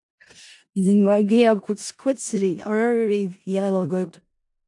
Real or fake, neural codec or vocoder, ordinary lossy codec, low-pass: fake; codec, 16 kHz in and 24 kHz out, 0.4 kbps, LongCat-Audio-Codec, four codebook decoder; AAC, 64 kbps; 10.8 kHz